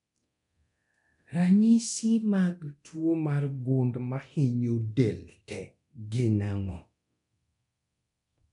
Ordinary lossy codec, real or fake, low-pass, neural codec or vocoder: none; fake; 10.8 kHz; codec, 24 kHz, 0.9 kbps, DualCodec